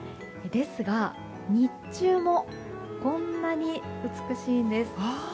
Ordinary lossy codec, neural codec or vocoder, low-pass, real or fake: none; none; none; real